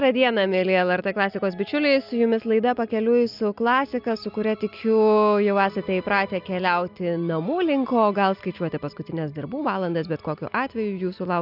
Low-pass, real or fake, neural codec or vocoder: 5.4 kHz; real; none